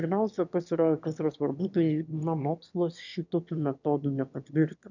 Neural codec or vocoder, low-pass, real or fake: autoencoder, 22.05 kHz, a latent of 192 numbers a frame, VITS, trained on one speaker; 7.2 kHz; fake